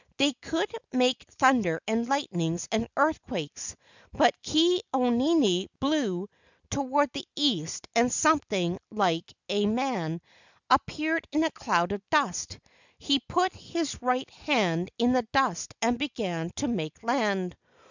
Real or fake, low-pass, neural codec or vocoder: real; 7.2 kHz; none